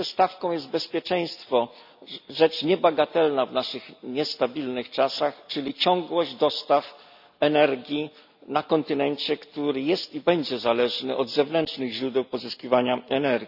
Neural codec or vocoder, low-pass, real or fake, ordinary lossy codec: none; 5.4 kHz; real; none